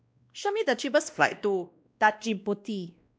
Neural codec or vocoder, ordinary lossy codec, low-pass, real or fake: codec, 16 kHz, 1 kbps, X-Codec, WavLM features, trained on Multilingual LibriSpeech; none; none; fake